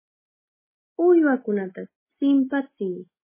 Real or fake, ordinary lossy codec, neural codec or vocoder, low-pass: real; MP3, 16 kbps; none; 3.6 kHz